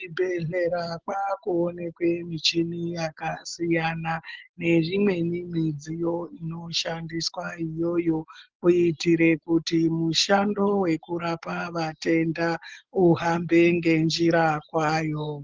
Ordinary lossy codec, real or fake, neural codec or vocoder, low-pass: Opus, 16 kbps; real; none; 7.2 kHz